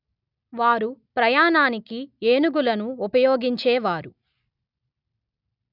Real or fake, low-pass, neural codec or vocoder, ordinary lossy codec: real; 5.4 kHz; none; none